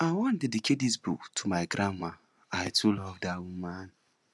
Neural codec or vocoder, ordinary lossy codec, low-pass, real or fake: none; none; none; real